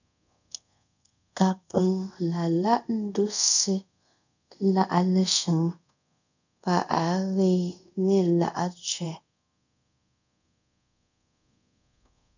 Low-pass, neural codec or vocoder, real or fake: 7.2 kHz; codec, 24 kHz, 0.5 kbps, DualCodec; fake